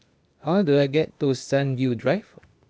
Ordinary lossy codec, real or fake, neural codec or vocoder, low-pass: none; fake; codec, 16 kHz, 0.8 kbps, ZipCodec; none